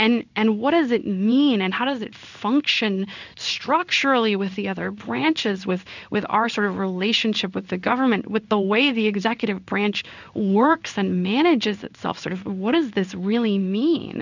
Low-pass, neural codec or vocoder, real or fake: 7.2 kHz; codec, 16 kHz in and 24 kHz out, 1 kbps, XY-Tokenizer; fake